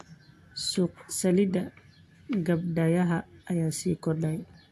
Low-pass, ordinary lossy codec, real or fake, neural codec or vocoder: 14.4 kHz; AAC, 64 kbps; real; none